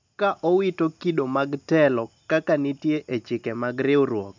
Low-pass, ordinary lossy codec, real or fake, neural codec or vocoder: 7.2 kHz; MP3, 64 kbps; real; none